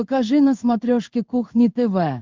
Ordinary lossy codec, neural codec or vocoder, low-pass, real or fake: Opus, 24 kbps; codec, 16 kHz in and 24 kHz out, 1 kbps, XY-Tokenizer; 7.2 kHz; fake